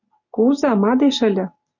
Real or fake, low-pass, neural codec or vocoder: real; 7.2 kHz; none